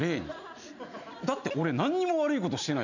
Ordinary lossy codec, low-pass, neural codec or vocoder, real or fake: none; 7.2 kHz; none; real